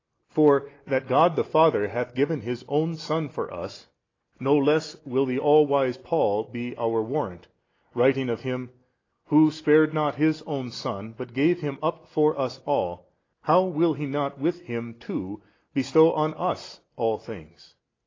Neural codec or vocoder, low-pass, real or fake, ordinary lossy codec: none; 7.2 kHz; real; AAC, 32 kbps